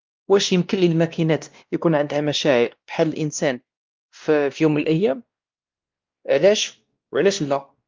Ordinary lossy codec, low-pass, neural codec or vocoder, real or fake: Opus, 24 kbps; 7.2 kHz; codec, 16 kHz, 1 kbps, X-Codec, WavLM features, trained on Multilingual LibriSpeech; fake